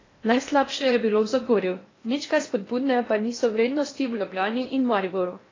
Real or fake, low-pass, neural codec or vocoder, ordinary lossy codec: fake; 7.2 kHz; codec, 16 kHz in and 24 kHz out, 0.8 kbps, FocalCodec, streaming, 65536 codes; AAC, 32 kbps